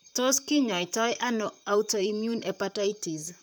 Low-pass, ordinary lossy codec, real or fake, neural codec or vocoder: none; none; fake; vocoder, 44.1 kHz, 128 mel bands, Pupu-Vocoder